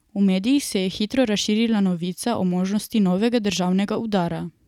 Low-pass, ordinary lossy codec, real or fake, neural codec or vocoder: 19.8 kHz; none; real; none